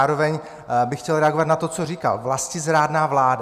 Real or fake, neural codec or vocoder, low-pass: real; none; 14.4 kHz